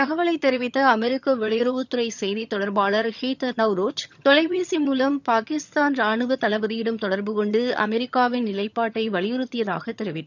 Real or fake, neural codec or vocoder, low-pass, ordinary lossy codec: fake; vocoder, 22.05 kHz, 80 mel bands, HiFi-GAN; 7.2 kHz; none